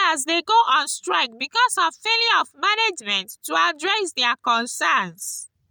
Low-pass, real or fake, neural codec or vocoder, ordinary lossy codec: none; real; none; none